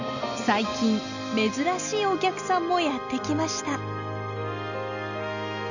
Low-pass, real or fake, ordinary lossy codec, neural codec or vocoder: 7.2 kHz; real; none; none